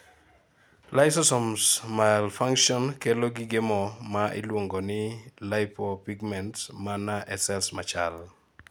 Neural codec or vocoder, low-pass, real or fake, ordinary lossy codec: none; none; real; none